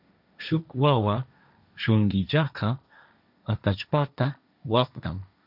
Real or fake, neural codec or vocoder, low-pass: fake; codec, 16 kHz, 1.1 kbps, Voila-Tokenizer; 5.4 kHz